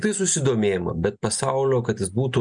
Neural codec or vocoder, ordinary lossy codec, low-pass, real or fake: none; MP3, 96 kbps; 9.9 kHz; real